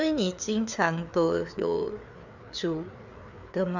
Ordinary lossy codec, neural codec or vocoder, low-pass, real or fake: none; codec, 16 kHz, 4 kbps, FreqCodec, larger model; 7.2 kHz; fake